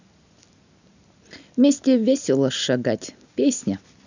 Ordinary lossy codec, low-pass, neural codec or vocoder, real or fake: none; 7.2 kHz; none; real